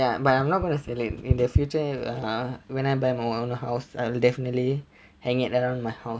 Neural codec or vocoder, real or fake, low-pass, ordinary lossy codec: none; real; none; none